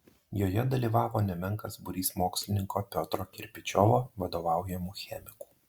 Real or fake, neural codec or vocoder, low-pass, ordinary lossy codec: real; none; 19.8 kHz; Opus, 64 kbps